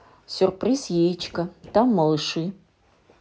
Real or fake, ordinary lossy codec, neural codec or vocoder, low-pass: real; none; none; none